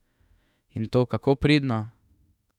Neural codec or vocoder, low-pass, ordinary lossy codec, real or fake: autoencoder, 48 kHz, 32 numbers a frame, DAC-VAE, trained on Japanese speech; 19.8 kHz; none; fake